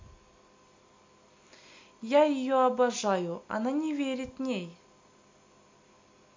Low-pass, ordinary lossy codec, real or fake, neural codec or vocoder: 7.2 kHz; MP3, 48 kbps; real; none